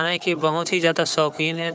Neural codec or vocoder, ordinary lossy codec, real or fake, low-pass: codec, 16 kHz, 4 kbps, FunCodec, trained on Chinese and English, 50 frames a second; none; fake; none